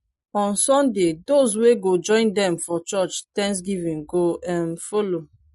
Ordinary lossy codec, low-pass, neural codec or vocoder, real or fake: MP3, 48 kbps; 19.8 kHz; none; real